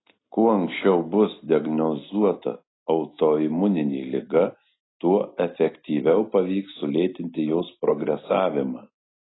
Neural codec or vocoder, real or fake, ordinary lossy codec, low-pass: none; real; AAC, 16 kbps; 7.2 kHz